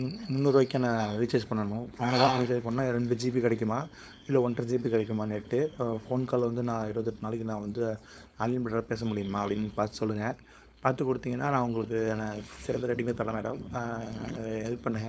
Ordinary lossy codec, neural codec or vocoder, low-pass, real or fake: none; codec, 16 kHz, 4.8 kbps, FACodec; none; fake